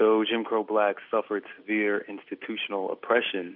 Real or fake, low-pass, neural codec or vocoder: real; 5.4 kHz; none